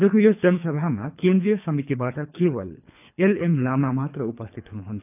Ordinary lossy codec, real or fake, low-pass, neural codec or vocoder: none; fake; 3.6 kHz; codec, 24 kHz, 3 kbps, HILCodec